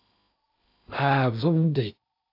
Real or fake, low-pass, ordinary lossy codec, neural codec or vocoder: fake; 5.4 kHz; AAC, 48 kbps; codec, 16 kHz in and 24 kHz out, 0.8 kbps, FocalCodec, streaming, 65536 codes